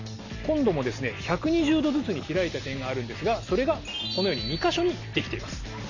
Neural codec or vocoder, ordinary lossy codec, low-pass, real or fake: none; none; 7.2 kHz; real